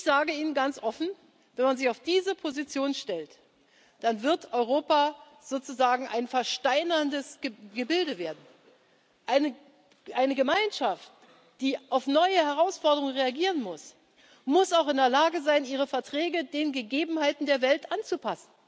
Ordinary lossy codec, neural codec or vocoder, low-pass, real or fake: none; none; none; real